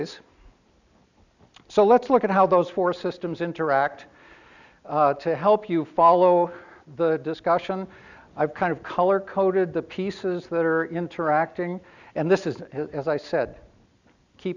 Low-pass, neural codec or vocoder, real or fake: 7.2 kHz; none; real